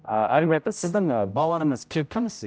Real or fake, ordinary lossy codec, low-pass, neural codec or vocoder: fake; none; none; codec, 16 kHz, 0.5 kbps, X-Codec, HuBERT features, trained on general audio